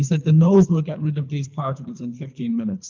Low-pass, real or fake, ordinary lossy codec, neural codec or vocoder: 7.2 kHz; fake; Opus, 24 kbps; codec, 24 kHz, 3 kbps, HILCodec